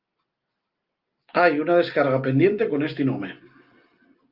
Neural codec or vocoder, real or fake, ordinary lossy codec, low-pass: none; real; Opus, 24 kbps; 5.4 kHz